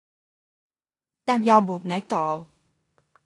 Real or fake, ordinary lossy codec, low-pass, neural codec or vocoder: fake; AAC, 48 kbps; 10.8 kHz; codec, 16 kHz in and 24 kHz out, 0.9 kbps, LongCat-Audio-Codec, four codebook decoder